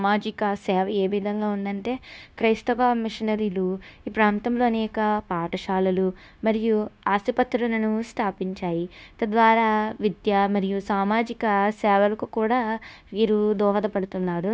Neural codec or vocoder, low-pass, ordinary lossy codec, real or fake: codec, 16 kHz, 0.9 kbps, LongCat-Audio-Codec; none; none; fake